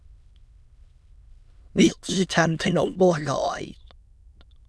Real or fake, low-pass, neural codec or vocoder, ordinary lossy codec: fake; none; autoencoder, 22.05 kHz, a latent of 192 numbers a frame, VITS, trained on many speakers; none